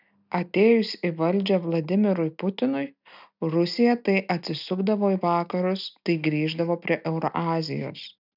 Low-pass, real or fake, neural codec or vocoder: 5.4 kHz; real; none